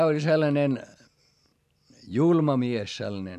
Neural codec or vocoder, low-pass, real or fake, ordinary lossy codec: none; 14.4 kHz; real; none